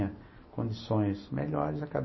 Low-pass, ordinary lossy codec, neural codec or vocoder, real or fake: 7.2 kHz; MP3, 24 kbps; none; real